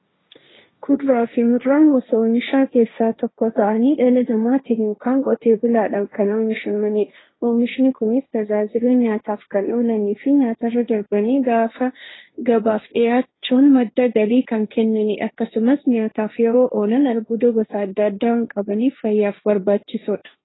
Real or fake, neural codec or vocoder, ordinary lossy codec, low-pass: fake; codec, 16 kHz, 1.1 kbps, Voila-Tokenizer; AAC, 16 kbps; 7.2 kHz